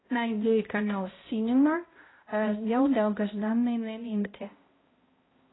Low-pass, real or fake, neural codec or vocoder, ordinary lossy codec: 7.2 kHz; fake; codec, 16 kHz, 0.5 kbps, X-Codec, HuBERT features, trained on balanced general audio; AAC, 16 kbps